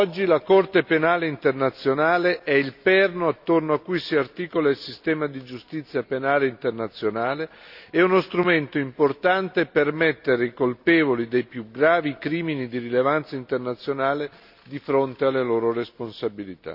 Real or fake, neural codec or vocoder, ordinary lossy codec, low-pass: real; none; none; 5.4 kHz